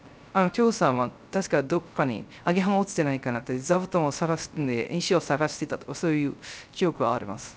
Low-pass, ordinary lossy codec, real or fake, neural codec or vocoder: none; none; fake; codec, 16 kHz, 0.3 kbps, FocalCodec